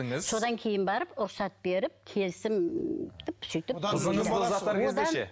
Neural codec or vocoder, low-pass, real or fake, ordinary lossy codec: none; none; real; none